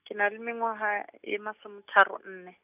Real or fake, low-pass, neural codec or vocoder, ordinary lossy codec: real; 3.6 kHz; none; none